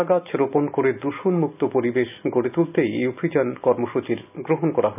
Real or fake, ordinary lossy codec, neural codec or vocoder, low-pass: real; none; none; 3.6 kHz